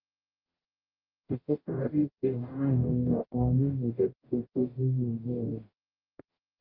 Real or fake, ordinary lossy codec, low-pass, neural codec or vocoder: fake; Opus, 24 kbps; 5.4 kHz; codec, 44.1 kHz, 0.9 kbps, DAC